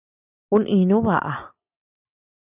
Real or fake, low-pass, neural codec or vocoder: real; 3.6 kHz; none